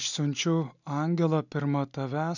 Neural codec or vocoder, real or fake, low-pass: none; real; 7.2 kHz